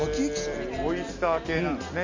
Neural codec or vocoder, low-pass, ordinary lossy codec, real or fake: none; 7.2 kHz; MP3, 64 kbps; real